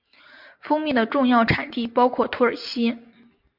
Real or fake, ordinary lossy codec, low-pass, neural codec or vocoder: real; MP3, 48 kbps; 5.4 kHz; none